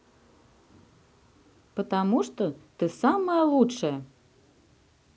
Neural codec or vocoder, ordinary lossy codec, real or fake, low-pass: none; none; real; none